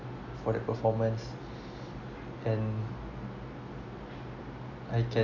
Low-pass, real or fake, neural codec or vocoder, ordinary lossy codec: 7.2 kHz; real; none; AAC, 48 kbps